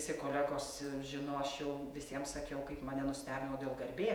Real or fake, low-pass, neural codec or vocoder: fake; 19.8 kHz; vocoder, 48 kHz, 128 mel bands, Vocos